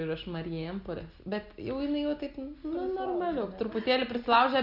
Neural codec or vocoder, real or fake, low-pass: none; real; 5.4 kHz